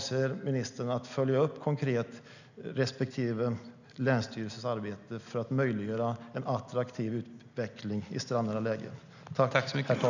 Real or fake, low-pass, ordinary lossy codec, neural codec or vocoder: real; 7.2 kHz; none; none